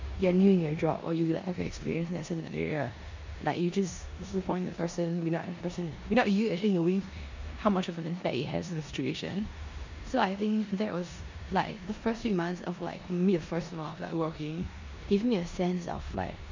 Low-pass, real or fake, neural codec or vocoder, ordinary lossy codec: 7.2 kHz; fake; codec, 16 kHz in and 24 kHz out, 0.9 kbps, LongCat-Audio-Codec, four codebook decoder; MP3, 64 kbps